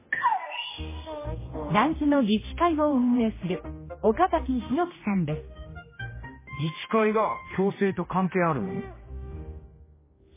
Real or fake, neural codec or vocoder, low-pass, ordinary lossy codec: fake; codec, 16 kHz, 1 kbps, X-Codec, HuBERT features, trained on general audio; 3.6 kHz; MP3, 16 kbps